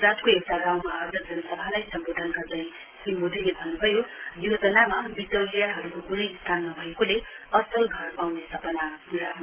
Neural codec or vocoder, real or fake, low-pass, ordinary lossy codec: none; real; 3.6 kHz; Opus, 16 kbps